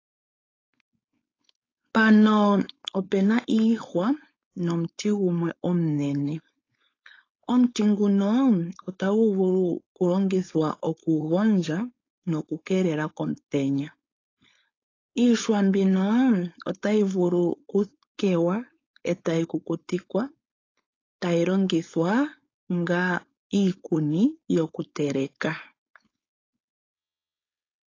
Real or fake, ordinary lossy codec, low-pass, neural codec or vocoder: fake; AAC, 32 kbps; 7.2 kHz; codec, 16 kHz, 4.8 kbps, FACodec